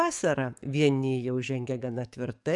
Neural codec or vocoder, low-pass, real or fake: codec, 44.1 kHz, 7.8 kbps, DAC; 10.8 kHz; fake